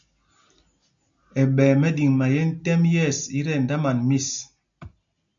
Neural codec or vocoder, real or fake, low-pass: none; real; 7.2 kHz